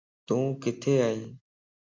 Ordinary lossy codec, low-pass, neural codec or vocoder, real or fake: MP3, 48 kbps; 7.2 kHz; none; real